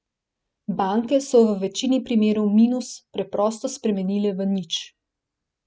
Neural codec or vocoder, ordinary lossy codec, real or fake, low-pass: none; none; real; none